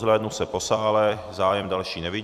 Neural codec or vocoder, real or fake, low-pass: vocoder, 44.1 kHz, 128 mel bands every 256 samples, BigVGAN v2; fake; 14.4 kHz